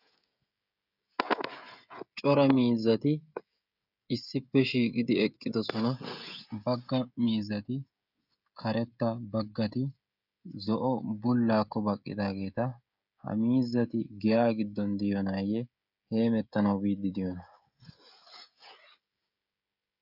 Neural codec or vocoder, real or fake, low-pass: codec, 16 kHz, 16 kbps, FreqCodec, smaller model; fake; 5.4 kHz